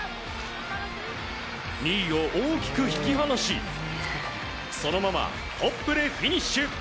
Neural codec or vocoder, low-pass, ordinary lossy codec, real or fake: none; none; none; real